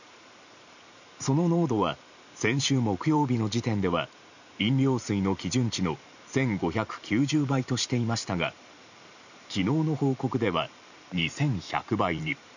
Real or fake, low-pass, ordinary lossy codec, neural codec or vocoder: fake; 7.2 kHz; none; vocoder, 44.1 kHz, 128 mel bands every 512 samples, BigVGAN v2